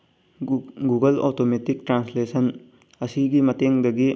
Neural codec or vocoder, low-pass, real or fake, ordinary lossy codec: none; none; real; none